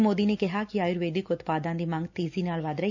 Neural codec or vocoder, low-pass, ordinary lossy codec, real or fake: none; 7.2 kHz; none; real